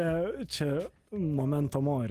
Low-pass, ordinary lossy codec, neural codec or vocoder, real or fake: 14.4 kHz; Opus, 24 kbps; none; real